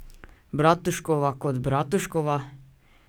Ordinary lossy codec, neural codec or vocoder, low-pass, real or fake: none; codec, 44.1 kHz, 7.8 kbps, DAC; none; fake